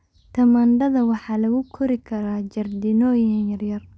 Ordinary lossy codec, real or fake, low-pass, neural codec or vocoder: none; real; none; none